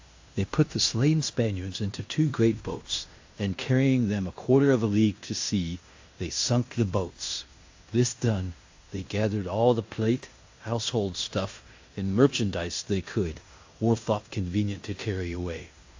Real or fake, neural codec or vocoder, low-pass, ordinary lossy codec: fake; codec, 16 kHz in and 24 kHz out, 0.9 kbps, LongCat-Audio-Codec, fine tuned four codebook decoder; 7.2 kHz; AAC, 48 kbps